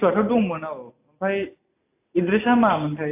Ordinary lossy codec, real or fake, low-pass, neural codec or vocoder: AAC, 24 kbps; real; 3.6 kHz; none